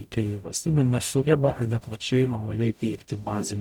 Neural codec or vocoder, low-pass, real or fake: codec, 44.1 kHz, 0.9 kbps, DAC; 19.8 kHz; fake